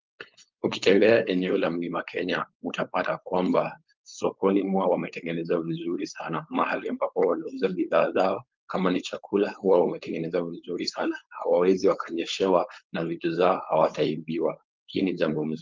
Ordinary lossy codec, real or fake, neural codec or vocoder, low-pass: Opus, 32 kbps; fake; codec, 16 kHz, 4.8 kbps, FACodec; 7.2 kHz